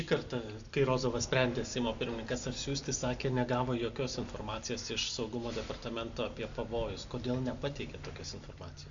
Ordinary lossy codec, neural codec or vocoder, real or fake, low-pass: Opus, 64 kbps; none; real; 7.2 kHz